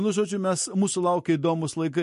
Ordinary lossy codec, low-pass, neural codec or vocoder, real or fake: MP3, 48 kbps; 14.4 kHz; none; real